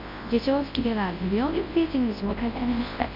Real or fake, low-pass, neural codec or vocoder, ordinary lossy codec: fake; 5.4 kHz; codec, 24 kHz, 0.9 kbps, WavTokenizer, large speech release; MP3, 32 kbps